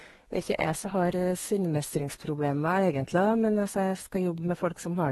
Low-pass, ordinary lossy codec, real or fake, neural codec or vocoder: 14.4 kHz; AAC, 32 kbps; fake; codec, 32 kHz, 1.9 kbps, SNAC